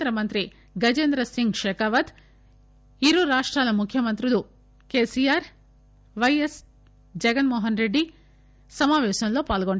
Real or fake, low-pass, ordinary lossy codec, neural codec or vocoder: real; none; none; none